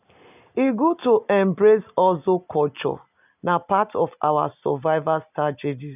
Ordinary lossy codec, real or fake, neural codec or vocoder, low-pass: none; real; none; 3.6 kHz